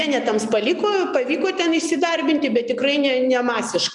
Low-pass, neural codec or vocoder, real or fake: 10.8 kHz; none; real